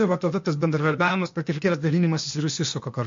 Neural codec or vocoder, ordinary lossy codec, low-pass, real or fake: codec, 16 kHz, 0.8 kbps, ZipCodec; MP3, 48 kbps; 7.2 kHz; fake